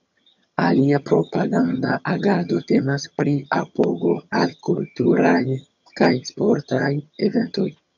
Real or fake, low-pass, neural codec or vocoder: fake; 7.2 kHz; vocoder, 22.05 kHz, 80 mel bands, HiFi-GAN